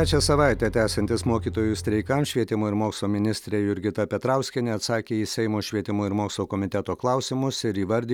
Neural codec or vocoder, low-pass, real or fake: none; 19.8 kHz; real